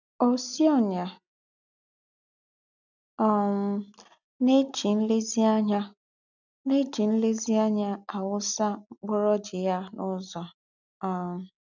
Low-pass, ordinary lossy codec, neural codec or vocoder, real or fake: 7.2 kHz; none; none; real